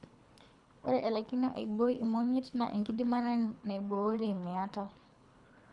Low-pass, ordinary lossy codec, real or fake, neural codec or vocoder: 10.8 kHz; none; fake; codec, 24 kHz, 3 kbps, HILCodec